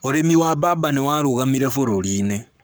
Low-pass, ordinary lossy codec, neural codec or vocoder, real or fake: none; none; codec, 44.1 kHz, 7.8 kbps, Pupu-Codec; fake